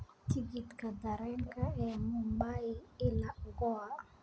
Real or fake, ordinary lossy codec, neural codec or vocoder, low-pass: real; none; none; none